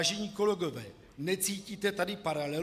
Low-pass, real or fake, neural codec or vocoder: 14.4 kHz; real; none